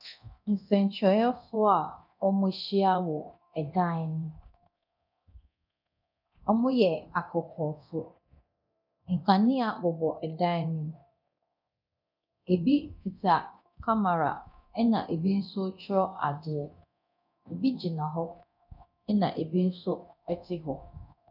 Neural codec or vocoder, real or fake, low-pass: codec, 24 kHz, 0.9 kbps, DualCodec; fake; 5.4 kHz